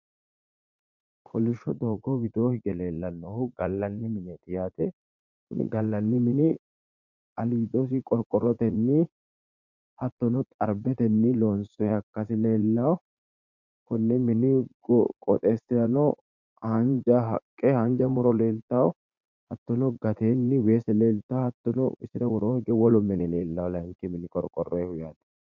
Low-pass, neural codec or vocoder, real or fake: 7.2 kHz; vocoder, 44.1 kHz, 80 mel bands, Vocos; fake